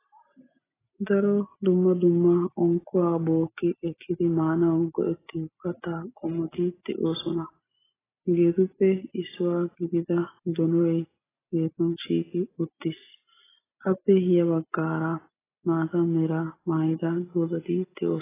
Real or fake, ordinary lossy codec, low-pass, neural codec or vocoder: real; AAC, 16 kbps; 3.6 kHz; none